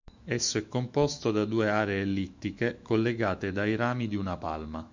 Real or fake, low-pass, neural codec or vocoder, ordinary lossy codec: fake; 7.2 kHz; autoencoder, 48 kHz, 128 numbers a frame, DAC-VAE, trained on Japanese speech; Opus, 64 kbps